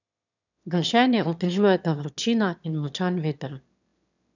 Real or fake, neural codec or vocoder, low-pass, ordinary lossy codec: fake; autoencoder, 22.05 kHz, a latent of 192 numbers a frame, VITS, trained on one speaker; 7.2 kHz; none